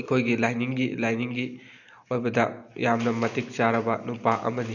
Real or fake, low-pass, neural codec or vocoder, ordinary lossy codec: real; 7.2 kHz; none; none